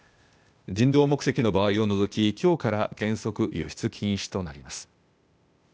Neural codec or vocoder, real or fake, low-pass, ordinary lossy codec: codec, 16 kHz, 0.8 kbps, ZipCodec; fake; none; none